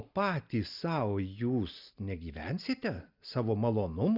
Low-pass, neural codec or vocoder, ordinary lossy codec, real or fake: 5.4 kHz; none; Opus, 64 kbps; real